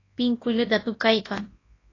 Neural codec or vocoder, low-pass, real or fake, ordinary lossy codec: codec, 24 kHz, 0.9 kbps, WavTokenizer, large speech release; 7.2 kHz; fake; AAC, 32 kbps